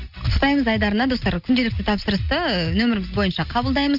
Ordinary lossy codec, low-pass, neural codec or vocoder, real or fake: none; 5.4 kHz; none; real